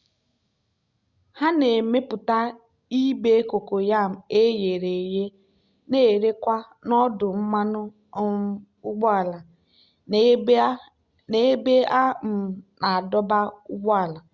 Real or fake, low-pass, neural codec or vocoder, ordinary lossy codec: real; 7.2 kHz; none; Opus, 64 kbps